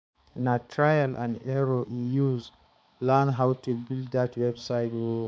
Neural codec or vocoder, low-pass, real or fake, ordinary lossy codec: codec, 16 kHz, 4 kbps, X-Codec, HuBERT features, trained on balanced general audio; none; fake; none